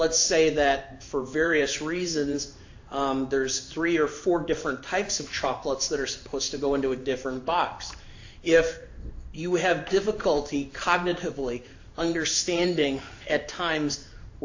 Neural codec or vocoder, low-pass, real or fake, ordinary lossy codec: codec, 16 kHz in and 24 kHz out, 1 kbps, XY-Tokenizer; 7.2 kHz; fake; AAC, 48 kbps